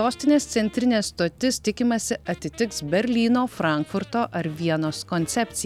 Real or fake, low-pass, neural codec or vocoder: real; 19.8 kHz; none